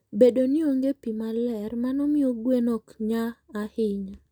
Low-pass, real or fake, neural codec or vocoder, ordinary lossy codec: 19.8 kHz; real; none; none